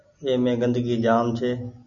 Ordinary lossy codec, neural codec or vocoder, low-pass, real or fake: MP3, 96 kbps; none; 7.2 kHz; real